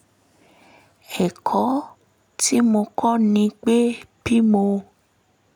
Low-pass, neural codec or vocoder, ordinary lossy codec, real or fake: 19.8 kHz; none; none; real